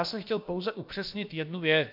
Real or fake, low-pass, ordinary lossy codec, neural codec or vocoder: fake; 5.4 kHz; MP3, 48 kbps; codec, 16 kHz, about 1 kbps, DyCAST, with the encoder's durations